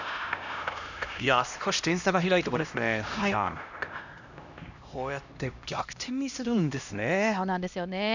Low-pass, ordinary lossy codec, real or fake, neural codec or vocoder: 7.2 kHz; none; fake; codec, 16 kHz, 1 kbps, X-Codec, HuBERT features, trained on LibriSpeech